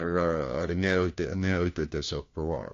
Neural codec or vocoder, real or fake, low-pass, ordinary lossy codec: codec, 16 kHz, 1 kbps, FunCodec, trained on LibriTTS, 50 frames a second; fake; 7.2 kHz; AAC, 48 kbps